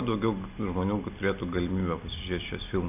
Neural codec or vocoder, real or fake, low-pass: none; real; 3.6 kHz